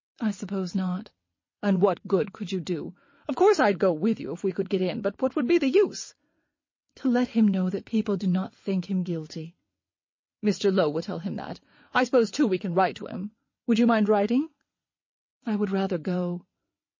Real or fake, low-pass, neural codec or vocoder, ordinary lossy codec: fake; 7.2 kHz; vocoder, 22.05 kHz, 80 mel bands, WaveNeXt; MP3, 32 kbps